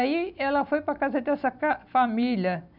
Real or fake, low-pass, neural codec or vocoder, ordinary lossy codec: real; 5.4 kHz; none; none